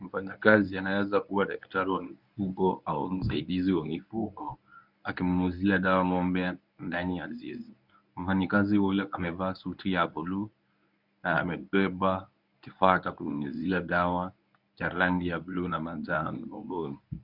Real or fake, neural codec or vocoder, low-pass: fake; codec, 24 kHz, 0.9 kbps, WavTokenizer, medium speech release version 1; 5.4 kHz